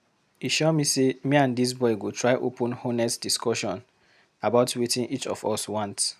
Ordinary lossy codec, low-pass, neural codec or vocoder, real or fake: none; 14.4 kHz; none; real